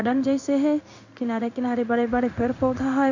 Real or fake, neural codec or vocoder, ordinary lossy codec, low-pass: fake; codec, 16 kHz in and 24 kHz out, 1 kbps, XY-Tokenizer; none; 7.2 kHz